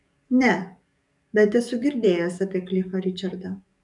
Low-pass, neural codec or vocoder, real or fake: 10.8 kHz; codec, 44.1 kHz, 7.8 kbps, DAC; fake